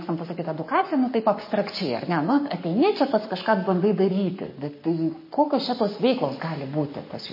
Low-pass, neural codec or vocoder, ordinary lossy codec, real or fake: 5.4 kHz; codec, 44.1 kHz, 7.8 kbps, Pupu-Codec; MP3, 24 kbps; fake